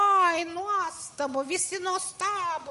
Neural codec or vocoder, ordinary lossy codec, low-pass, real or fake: none; MP3, 48 kbps; 14.4 kHz; real